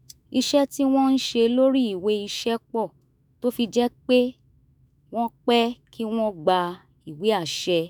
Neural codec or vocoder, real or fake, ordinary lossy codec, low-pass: autoencoder, 48 kHz, 128 numbers a frame, DAC-VAE, trained on Japanese speech; fake; none; none